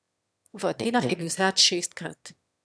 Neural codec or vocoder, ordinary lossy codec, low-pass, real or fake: autoencoder, 22.05 kHz, a latent of 192 numbers a frame, VITS, trained on one speaker; none; none; fake